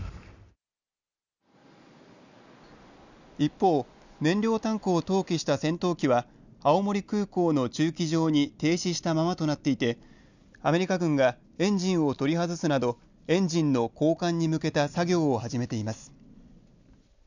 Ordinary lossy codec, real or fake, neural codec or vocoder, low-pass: none; real; none; 7.2 kHz